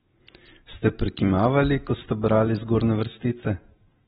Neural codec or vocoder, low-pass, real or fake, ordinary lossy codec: none; 10.8 kHz; real; AAC, 16 kbps